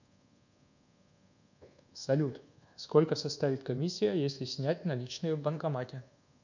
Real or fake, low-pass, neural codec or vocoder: fake; 7.2 kHz; codec, 24 kHz, 1.2 kbps, DualCodec